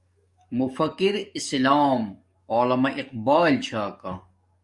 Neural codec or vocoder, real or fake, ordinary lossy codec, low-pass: codec, 44.1 kHz, 7.8 kbps, DAC; fake; Opus, 64 kbps; 10.8 kHz